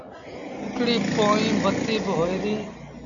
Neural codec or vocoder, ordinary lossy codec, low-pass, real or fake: none; MP3, 96 kbps; 7.2 kHz; real